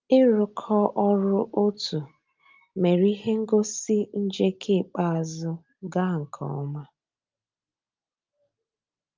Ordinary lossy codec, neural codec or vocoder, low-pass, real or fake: Opus, 24 kbps; none; 7.2 kHz; real